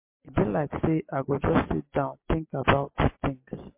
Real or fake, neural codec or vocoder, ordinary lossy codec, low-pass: real; none; MP3, 24 kbps; 3.6 kHz